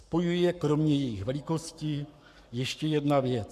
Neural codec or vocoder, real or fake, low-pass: codec, 44.1 kHz, 7.8 kbps, Pupu-Codec; fake; 14.4 kHz